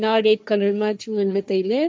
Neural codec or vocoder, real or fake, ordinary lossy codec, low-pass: codec, 16 kHz, 1.1 kbps, Voila-Tokenizer; fake; none; none